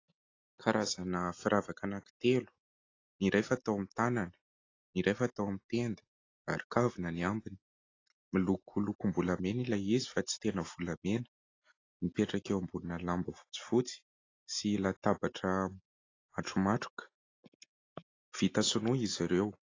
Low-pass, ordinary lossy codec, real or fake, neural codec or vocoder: 7.2 kHz; AAC, 32 kbps; real; none